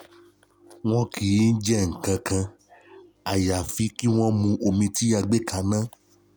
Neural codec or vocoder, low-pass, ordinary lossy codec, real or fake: none; none; none; real